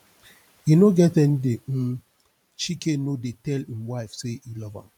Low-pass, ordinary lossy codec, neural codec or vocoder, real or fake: 19.8 kHz; none; vocoder, 44.1 kHz, 128 mel bands every 512 samples, BigVGAN v2; fake